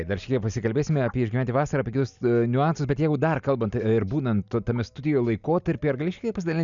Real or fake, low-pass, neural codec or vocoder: real; 7.2 kHz; none